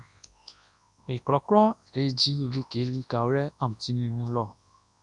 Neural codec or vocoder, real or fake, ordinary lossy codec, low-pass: codec, 24 kHz, 0.9 kbps, WavTokenizer, large speech release; fake; MP3, 96 kbps; 10.8 kHz